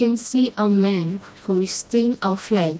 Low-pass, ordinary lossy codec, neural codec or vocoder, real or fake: none; none; codec, 16 kHz, 1 kbps, FreqCodec, smaller model; fake